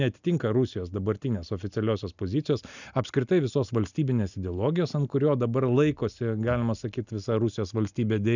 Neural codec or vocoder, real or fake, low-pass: none; real; 7.2 kHz